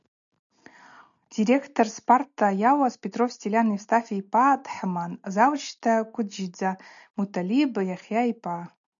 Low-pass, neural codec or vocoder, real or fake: 7.2 kHz; none; real